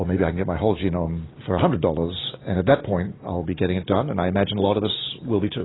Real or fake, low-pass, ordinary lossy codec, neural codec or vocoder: real; 7.2 kHz; AAC, 16 kbps; none